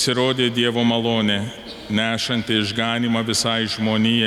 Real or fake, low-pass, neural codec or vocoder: real; 19.8 kHz; none